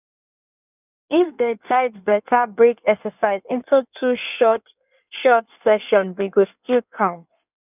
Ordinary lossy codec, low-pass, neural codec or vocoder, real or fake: none; 3.6 kHz; codec, 16 kHz in and 24 kHz out, 1.1 kbps, FireRedTTS-2 codec; fake